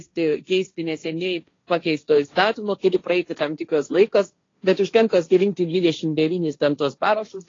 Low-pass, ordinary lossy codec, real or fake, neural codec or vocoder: 7.2 kHz; AAC, 32 kbps; fake; codec, 16 kHz, 1.1 kbps, Voila-Tokenizer